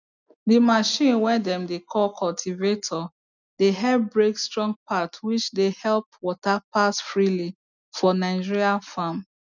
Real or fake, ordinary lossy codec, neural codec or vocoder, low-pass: real; none; none; 7.2 kHz